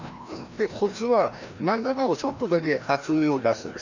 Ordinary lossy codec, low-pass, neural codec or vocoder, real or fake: none; 7.2 kHz; codec, 16 kHz, 1 kbps, FreqCodec, larger model; fake